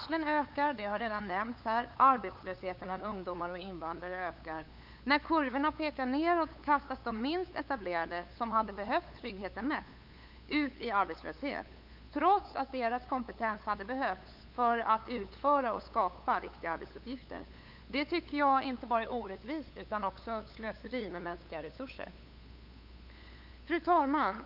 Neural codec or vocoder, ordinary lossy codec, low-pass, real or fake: codec, 16 kHz, 8 kbps, FunCodec, trained on LibriTTS, 25 frames a second; none; 5.4 kHz; fake